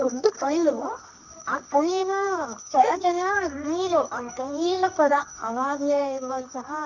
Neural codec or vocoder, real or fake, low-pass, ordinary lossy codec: codec, 24 kHz, 0.9 kbps, WavTokenizer, medium music audio release; fake; 7.2 kHz; none